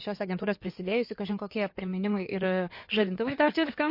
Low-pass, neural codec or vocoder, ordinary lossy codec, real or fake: 5.4 kHz; codec, 16 kHz in and 24 kHz out, 2.2 kbps, FireRedTTS-2 codec; MP3, 32 kbps; fake